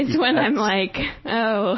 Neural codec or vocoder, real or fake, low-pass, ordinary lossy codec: none; real; 7.2 kHz; MP3, 24 kbps